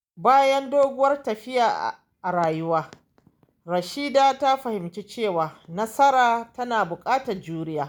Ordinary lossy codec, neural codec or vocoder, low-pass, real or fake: none; none; none; real